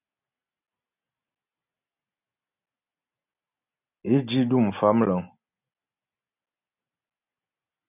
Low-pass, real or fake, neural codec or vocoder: 3.6 kHz; real; none